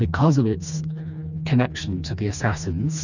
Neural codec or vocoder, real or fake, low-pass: codec, 16 kHz, 2 kbps, FreqCodec, smaller model; fake; 7.2 kHz